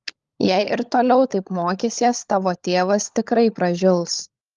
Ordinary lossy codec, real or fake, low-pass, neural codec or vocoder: Opus, 32 kbps; fake; 7.2 kHz; codec, 16 kHz, 16 kbps, FunCodec, trained on LibriTTS, 50 frames a second